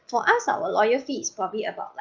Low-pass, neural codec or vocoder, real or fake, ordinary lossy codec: 7.2 kHz; none; real; Opus, 32 kbps